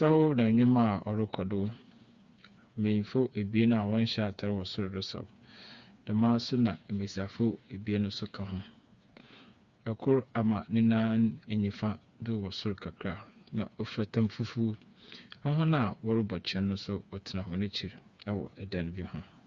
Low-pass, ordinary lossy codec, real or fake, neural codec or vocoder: 7.2 kHz; Opus, 64 kbps; fake; codec, 16 kHz, 4 kbps, FreqCodec, smaller model